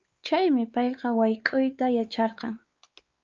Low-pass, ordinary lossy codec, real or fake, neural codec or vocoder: 7.2 kHz; Opus, 24 kbps; fake; codec, 16 kHz, 4 kbps, X-Codec, WavLM features, trained on Multilingual LibriSpeech